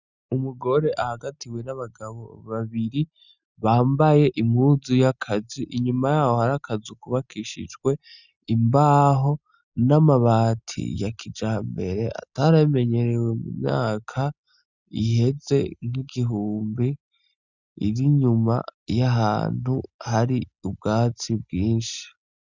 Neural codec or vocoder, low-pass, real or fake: none; 7.2 kHz; real